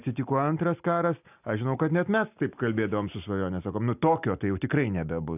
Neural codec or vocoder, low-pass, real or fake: none; 3.6 kHz; real